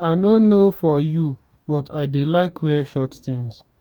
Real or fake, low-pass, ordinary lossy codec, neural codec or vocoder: fake; 19.8 kHz; none; codec, 44.1 kHz, 2.6 kbps, DAC